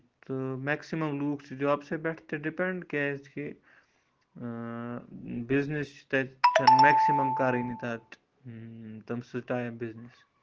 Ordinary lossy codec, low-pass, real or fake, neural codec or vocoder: Opus, 16 kbps; 7.2 kHz; real; none